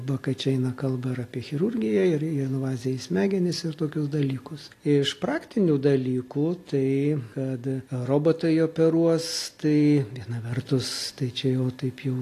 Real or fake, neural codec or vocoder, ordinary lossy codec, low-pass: real; none; AAC, 48 kbps; 14.4 kHz